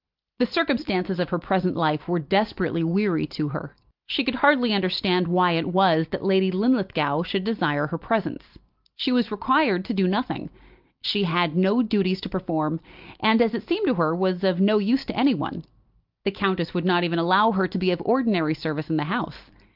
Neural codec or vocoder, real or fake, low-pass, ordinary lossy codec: none; real; 5.4 kHz; Opus, 24 kbps